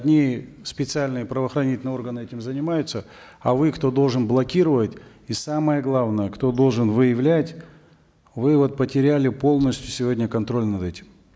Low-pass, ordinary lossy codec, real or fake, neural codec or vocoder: none; none; real; none